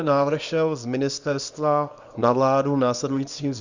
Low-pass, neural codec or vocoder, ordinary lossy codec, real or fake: 7.2 kHz; codec, 24 kHz, 0.9 kbps, WavTokenizer, small release; Opus, 64 kbps; fake